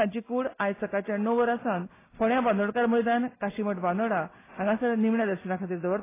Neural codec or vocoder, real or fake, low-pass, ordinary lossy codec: none; real; 3.6 kHz; AAC, 16 kbps